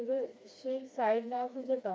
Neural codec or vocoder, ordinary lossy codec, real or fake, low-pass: codec, 16 kHz, 2 kbps, FreqCodec, smaller model; none; fake; none